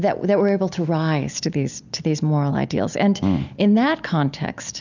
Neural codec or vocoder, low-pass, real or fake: none; 7.2 kHz; real